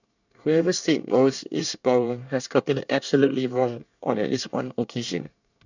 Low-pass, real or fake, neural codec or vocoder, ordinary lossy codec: 7.2 kHz; fake; codec, 24 kHz, 1 kbps, SNAC; none